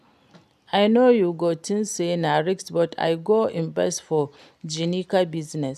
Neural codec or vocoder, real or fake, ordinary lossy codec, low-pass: none; real; none; 14.4 kHz